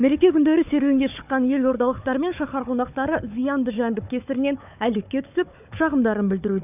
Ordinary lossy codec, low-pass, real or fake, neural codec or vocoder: none; 3.6 kHz; fake; codec, 16 kHz, 4 kbps, FunCodec, trained on Chinese and English, 50 frames a second